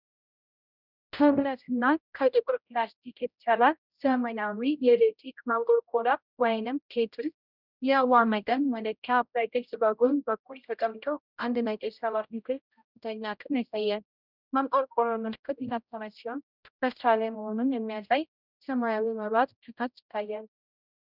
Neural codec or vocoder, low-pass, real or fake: codec, 16 kHz, 0.5 kbps, X-Codec, HuBERT features, trained on general audio; 5.4 kHz; fake